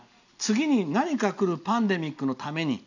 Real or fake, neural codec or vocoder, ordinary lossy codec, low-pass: real; none; none; 7.2 kHz